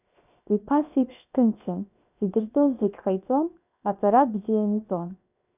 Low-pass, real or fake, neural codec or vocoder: 3.6 kHz; fake; codec, 16 kHz, 0.7 kbps, FocalCodec